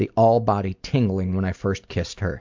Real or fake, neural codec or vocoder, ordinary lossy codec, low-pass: real; none; MP3, 64 kbps; 7.2 kHz